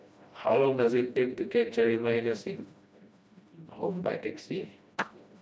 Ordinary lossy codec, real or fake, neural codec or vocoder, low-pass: none; fake; codec, 16 kHz, 1 kbps, FreqCodec, smaller model; none